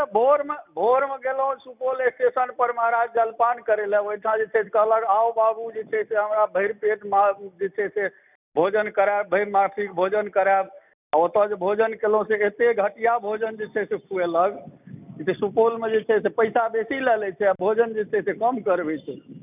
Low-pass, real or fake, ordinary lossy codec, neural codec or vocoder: 3.6 kHz; real; none; none